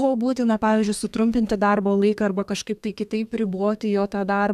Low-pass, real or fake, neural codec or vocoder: 14.4 kHz; fake; codec, 32 kHz, 1.9 kbps, SNAC